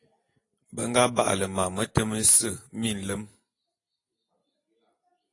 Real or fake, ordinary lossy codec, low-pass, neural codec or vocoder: real; AAC, 32 kbps; 10.8 kHz; none